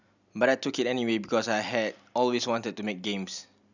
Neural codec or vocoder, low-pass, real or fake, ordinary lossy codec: none; 7.2 kHz; real; none